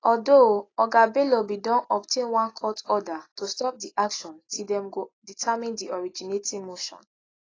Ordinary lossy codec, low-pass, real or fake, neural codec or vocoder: AAC, 32 kbps; 7.2 kHz; real; none